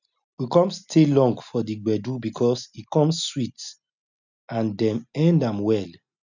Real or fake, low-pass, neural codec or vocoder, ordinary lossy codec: real; 7.2 kHz; none; none